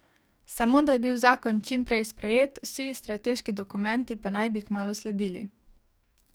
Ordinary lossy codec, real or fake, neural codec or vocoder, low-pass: none; fake; codec, 44.1 kHz, 2.6 kbps, DAC; none